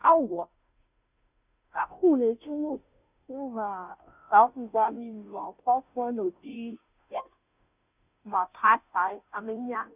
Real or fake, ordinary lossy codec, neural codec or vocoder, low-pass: fake; none; codec, 16 kHz, 0.5 kbps, FunCodec, trained on Chinese and English, 25 frames a second; 3.6 kHz